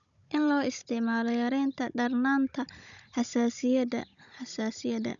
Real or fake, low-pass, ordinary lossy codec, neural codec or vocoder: fake; 7.2 kHz; none; codec, 16 kHz, 16 kbps, FunCodec, trained on Chinese and English, 50 frames a second